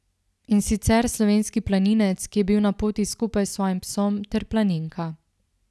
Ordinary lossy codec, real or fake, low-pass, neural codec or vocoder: none; real; none; none